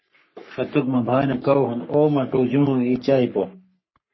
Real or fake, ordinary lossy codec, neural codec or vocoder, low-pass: fake; MP3, 24 kbps; codec, 44.1 kHz, 3.4 kbps, Pupu-Codec; 7.2 kHz